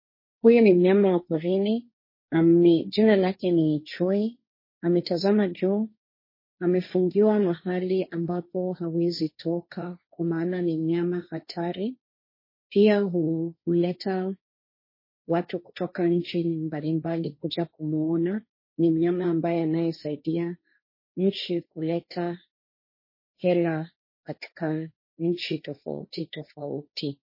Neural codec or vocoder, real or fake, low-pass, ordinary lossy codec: codec, 16 kHz, 1.1 kbps, Voila-Tokenizer; fake; 5.4 kHz; MP3, 24 kbps